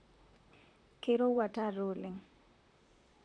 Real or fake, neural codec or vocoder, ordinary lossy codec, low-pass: fake; vocoder, 44.1 kHz, 128 mel bands, Pupu-Vocoder; none; 9.9 kHz